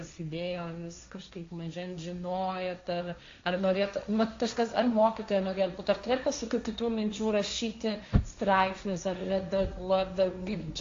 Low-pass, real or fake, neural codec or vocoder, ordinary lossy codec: 7.2 kHz; fake; codec, 16 kHz, 1.1 kbps, Voila-Tokenizer; AAC, 48 kbps